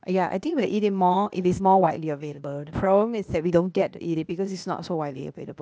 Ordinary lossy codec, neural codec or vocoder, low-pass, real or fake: none; codec, 16 kHz, 0.8 kbps, ZipCodec; none; fake